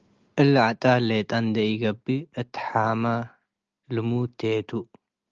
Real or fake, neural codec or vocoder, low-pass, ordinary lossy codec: real; none; 7.2 kHz; Opus, 24 kbps